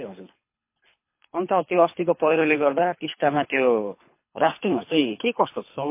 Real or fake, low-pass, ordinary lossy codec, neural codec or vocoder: fake; 3.6 kHz; MP3, 24 kbps; codec, 24 kHz, 3 kbps, HILCodec